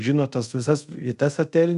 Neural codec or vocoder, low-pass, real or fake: codec, 24 kHz, 0.5 kbps, DualCodec; 10.8 kHz; fake